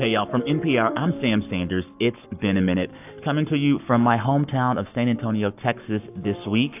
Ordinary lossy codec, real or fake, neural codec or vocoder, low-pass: AAC, 32 kbps; fake; codec, 44.1 kHz, 7.8 kbps, Pupu-Codec; 3.6 kHz